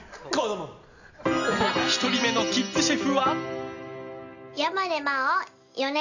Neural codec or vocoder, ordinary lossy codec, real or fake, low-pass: none; none; real; 7.2 kHz